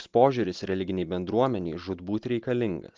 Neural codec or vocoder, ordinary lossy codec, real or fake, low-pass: none; Opus, 32 kbps; real; 7.2 kHz